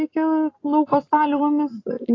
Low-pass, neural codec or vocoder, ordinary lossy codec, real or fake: 7.2 kHz; none; AAC, 32 kbps; real